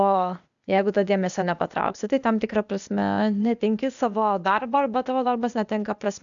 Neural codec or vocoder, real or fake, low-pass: codec, 16 kHz, 0.8 kbps, ZipCodec; fake; 7.2 kHz